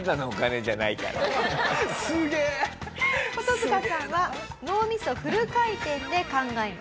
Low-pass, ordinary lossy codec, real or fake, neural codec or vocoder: none; none; real; none